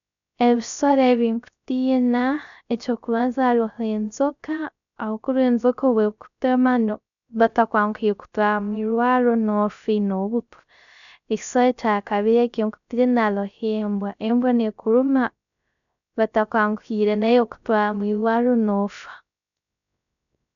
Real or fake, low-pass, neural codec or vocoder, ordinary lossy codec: fake; 7.2 kHz; codec, 16 kHz, 0.3 kbps, FocalCodec; none